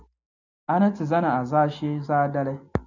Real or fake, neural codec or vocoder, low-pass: real; none; 7.2 kHz